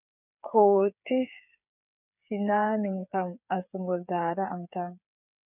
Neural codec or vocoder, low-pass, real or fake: codec, 16 kHz, 8 kbps, FreqCodec, smaller model; 3.6 kHz; fake